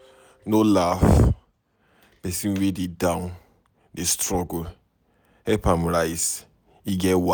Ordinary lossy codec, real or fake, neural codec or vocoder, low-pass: none; real; none; none